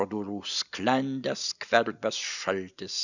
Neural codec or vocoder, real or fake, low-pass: none; real; 7.2 kHz